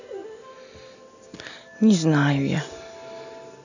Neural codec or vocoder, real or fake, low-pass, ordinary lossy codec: none; real; 7.2 kHz; none